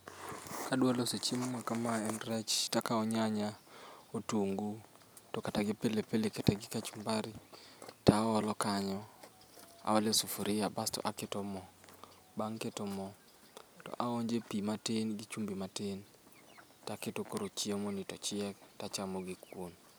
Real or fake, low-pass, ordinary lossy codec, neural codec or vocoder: real; none; none; none